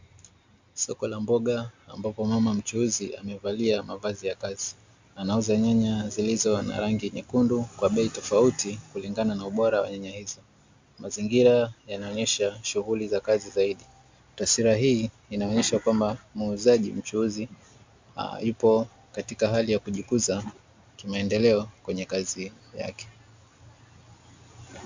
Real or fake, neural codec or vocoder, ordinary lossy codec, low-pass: real; none; MP3, 64 kbps; 7.2 kHz